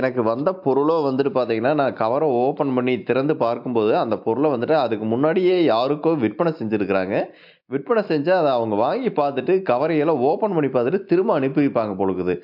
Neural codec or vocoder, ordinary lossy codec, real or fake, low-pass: none; AAC, 48 kbps; real; 5.4 kHz